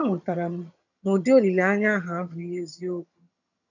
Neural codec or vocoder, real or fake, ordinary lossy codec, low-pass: vocoder, 22.05 kHz, 80 mel bands, HiFi-GAN; fake; none; 7.2 kHz